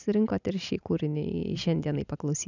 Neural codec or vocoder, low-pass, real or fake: none; 7.2 kHz; real